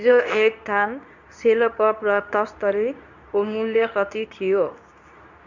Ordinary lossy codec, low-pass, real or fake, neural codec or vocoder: none; 7.2 kHz; fake; codec, 24 kHz, 0.9 kbps, WavTokenizer, medium speech release version 2